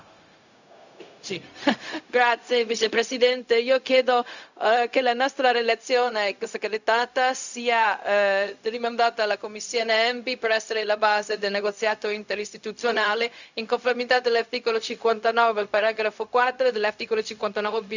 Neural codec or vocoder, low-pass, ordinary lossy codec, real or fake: codec, 16 kHz, 0.4 kbps, LongCat-Audio-Codec; 7.2 kHz; none; fake